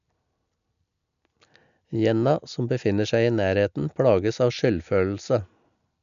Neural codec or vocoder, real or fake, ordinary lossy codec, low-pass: none; real; none; 7.2 kHz